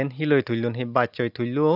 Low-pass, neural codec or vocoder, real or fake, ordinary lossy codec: 5.4 kHz; none; real; none